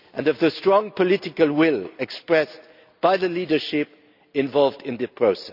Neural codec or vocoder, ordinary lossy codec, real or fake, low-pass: none; none; real; 5.4 kHz